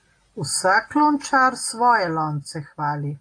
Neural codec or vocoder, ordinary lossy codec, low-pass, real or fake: none; AAC, 48 kbps; 9.9 kHz; real